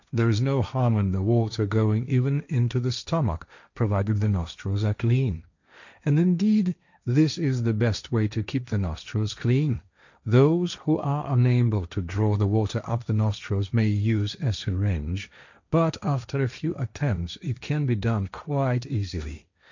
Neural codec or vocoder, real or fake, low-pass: codec, 16 kHz, 1.1 kbps, Voila-Tokenizer; fake; 7.2 kHz